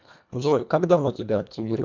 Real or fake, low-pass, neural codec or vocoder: fake; 7.2 kHz; codec, 24 kHz, 1.5 kbps, HILCodec